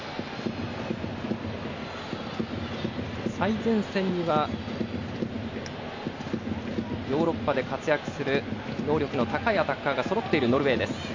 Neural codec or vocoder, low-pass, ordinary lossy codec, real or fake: none; 7.2 kHz; MP3, 64 kbps; real